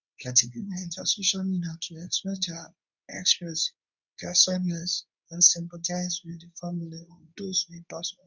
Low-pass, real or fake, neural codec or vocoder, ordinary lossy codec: 7.2 kHz; fake; codec, 24 kHz, 0.9 kbps, WavTokenizer, medium speech release version 2; none